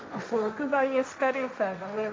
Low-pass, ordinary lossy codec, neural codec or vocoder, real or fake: none; none; codec, 16 kHz, 1.1 kbps, Voila-Tokenizer; fake